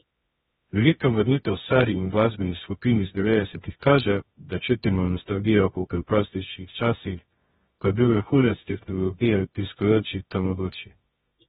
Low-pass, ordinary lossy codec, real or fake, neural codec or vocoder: 10.8 kHz; AAC, 16 kbps; fake; codec, 24 kHz, 0.9 kbps, WavTokenizer, medium music audio release